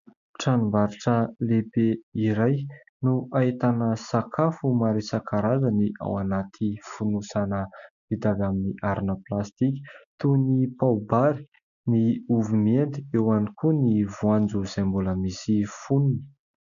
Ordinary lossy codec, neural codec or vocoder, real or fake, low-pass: MP3, 96 kbps; none; real; 7.2 kHz